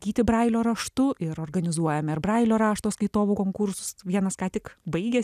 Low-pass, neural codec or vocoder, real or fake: 14.4 kHz; none; real